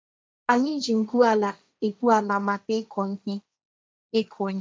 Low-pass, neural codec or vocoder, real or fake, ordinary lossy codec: none; codec, 16 kHz, 1.1 kbps, Voila-Tokenizer; fake; none